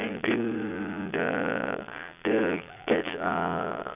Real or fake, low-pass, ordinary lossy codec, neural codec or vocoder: fake; 3.6 kHz; none; vocoder, 22.05 kHz, 80 mel bands, Vocos